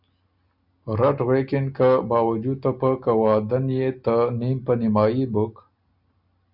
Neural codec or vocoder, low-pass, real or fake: none; 5.4 kHz; real